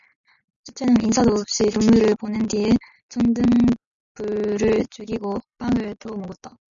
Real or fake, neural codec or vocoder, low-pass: real; none; 7.2 kHz